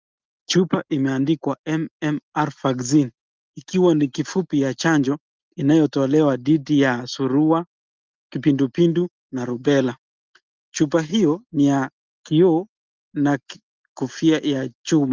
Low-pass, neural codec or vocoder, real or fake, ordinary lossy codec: 7.2 kHz; none; real; Opus, 32 kbps